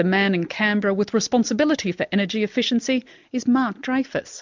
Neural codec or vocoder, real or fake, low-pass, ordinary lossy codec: none; real; 7.2 kHz; MP3, 64 kbps